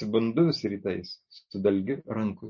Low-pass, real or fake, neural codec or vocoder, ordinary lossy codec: 7.2 kHz; real; none; MP3, 32 kbps